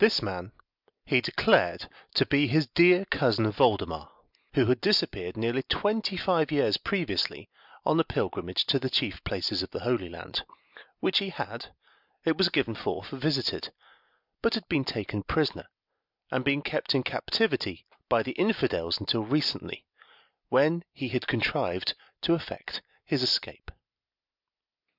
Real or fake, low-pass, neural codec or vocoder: real; 5.4 kHz; none